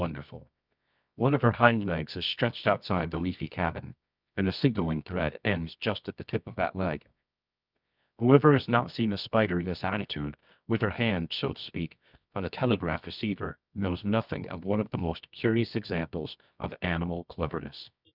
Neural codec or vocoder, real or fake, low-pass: codec, 24 kHz, 0.9 kbps, WavTokenizer, medium music audio release; fake; 5.4 kHz